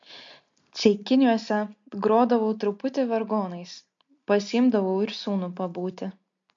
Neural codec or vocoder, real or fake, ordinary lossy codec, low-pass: none; real; MP3, 48 kbps; 7.2 kHz